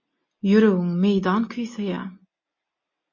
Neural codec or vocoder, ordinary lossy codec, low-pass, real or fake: none; MP3, 32 kbps; 7.2 kHz; real